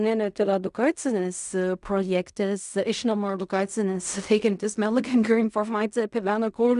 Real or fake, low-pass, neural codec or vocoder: fake; 10.8 kHz; codec, 16 kHz in and 24 kHz out, 0.4 kbps, LongCat-Audio-Codec, fine tuned four codebook decoder